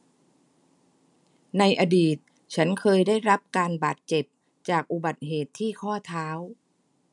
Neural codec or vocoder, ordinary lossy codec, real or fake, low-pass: none; none; real; 10.8 kHz